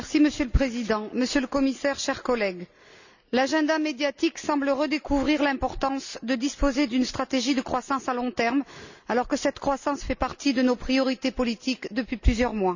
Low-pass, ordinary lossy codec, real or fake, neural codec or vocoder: 7.2 kHz; none; real; none